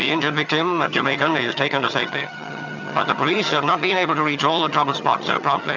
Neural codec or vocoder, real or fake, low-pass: vocoder, 22.05 kHz, 80 mel bands, HiFi-GAN; fake; 7.2 kHz